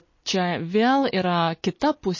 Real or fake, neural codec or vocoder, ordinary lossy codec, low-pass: real; none; MP3, 32 kbps; 7.2 kHz